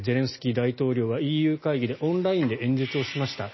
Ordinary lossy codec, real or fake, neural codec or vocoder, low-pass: MP3, 24 kbps; real; none; 7.2 kHz